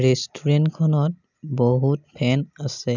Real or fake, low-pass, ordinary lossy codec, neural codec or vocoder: real; 7.2 kHz; none; none